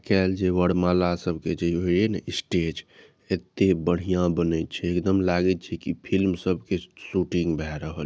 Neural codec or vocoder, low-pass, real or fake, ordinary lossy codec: none; none; real; none